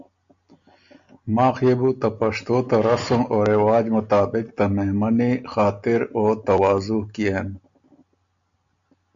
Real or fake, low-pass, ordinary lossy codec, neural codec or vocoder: real; 7.2 kHz; MP3, 64 kbps; none